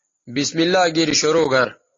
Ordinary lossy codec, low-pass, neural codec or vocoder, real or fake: AAC, 32 kbps; 7.2 kHz; none; real